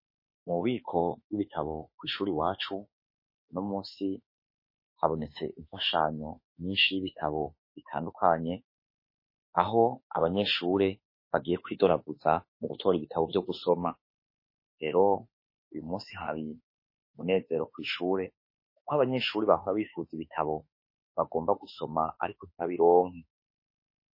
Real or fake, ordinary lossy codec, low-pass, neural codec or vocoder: fake; MP3, 24 kbps; 5.4 kHz; autoencoder, 48 kHz, 32 numbers a frame, DAC-VAE, trained on Japanese speech